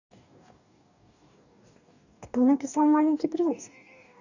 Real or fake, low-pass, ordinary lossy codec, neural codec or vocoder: fake; 7.2 kHz; none; codec, 44.1 kHz, 2.6 kbps, DAC